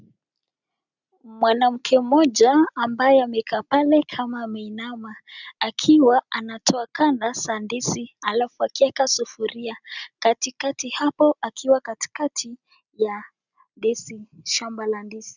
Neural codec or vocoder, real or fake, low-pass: none; real; 7.2 kHz